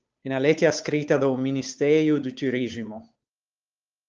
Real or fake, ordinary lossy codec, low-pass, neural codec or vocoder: fake; Opus, 24 kbps; 7.2 kHz; codec, 16 kHz, 8 kbps, FunCodec, trained on Chinese and English, 25 frames a second